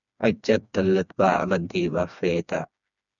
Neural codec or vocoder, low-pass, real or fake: codec, 16 kHz, 2 kbps, FreqCodec, smaller model; 7.2 kHz; fake